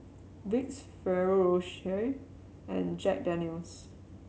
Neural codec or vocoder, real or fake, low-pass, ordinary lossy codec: none; real; none; none